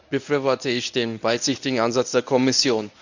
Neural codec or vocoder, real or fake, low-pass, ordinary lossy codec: codec, 24 kHz, 0.9 kbps, WavTokenizer, medium speech release version 1; fake; 7.2 kHz; none